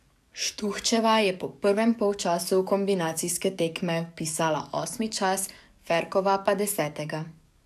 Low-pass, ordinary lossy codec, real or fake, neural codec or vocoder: 14.4 kHz; none; fake; codec, 44.1 kHz, 7.8 kbps, DAC